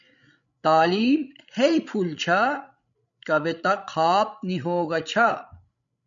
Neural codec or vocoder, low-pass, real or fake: codec, 16 kHz, 16 kbps, FreqCodec, larger model; 7.2 kHz; fake